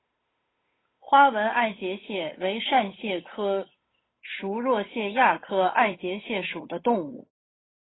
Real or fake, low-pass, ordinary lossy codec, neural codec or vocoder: fake; 7.2 kHz; AAC, 16 kbps; codec, 16 kHz, 8 kbps, FunCodec, trained on Chinese and English, 25 frames a second